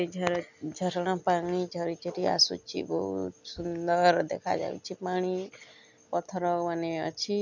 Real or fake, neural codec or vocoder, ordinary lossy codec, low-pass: real; none; none; 7.2 kHz